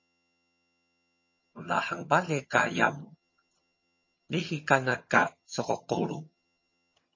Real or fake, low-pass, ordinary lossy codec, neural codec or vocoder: fake; 7.2 kHz; MP3, 32 kbps; vocoder, 22.05 kHz, 80 mel bands, HiFi-GAN